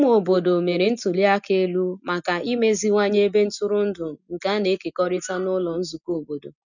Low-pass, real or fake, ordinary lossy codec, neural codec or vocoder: 7.2 kHz; real; none; none